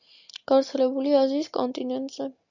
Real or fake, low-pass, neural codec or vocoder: real; 7.2 kHz; none